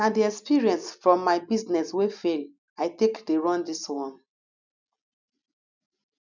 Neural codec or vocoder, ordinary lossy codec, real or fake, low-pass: none; none; real; 7.2 kHz